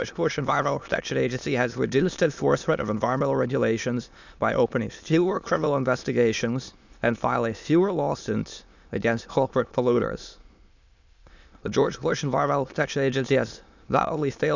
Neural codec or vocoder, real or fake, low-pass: autoencoder, 22.05 kHz, a latent of 192 numbers a frame, VITS, trained on many speakers; fake; 7.2 kHz